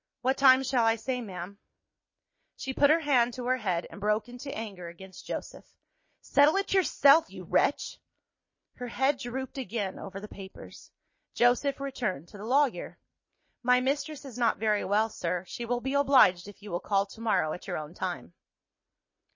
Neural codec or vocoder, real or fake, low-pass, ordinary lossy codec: none; real; 7.2 kHz; MP3, 32 kbps